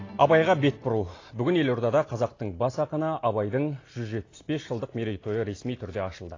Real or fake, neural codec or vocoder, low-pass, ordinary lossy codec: real; none; 7.2 kHz; AAC, 32 kbps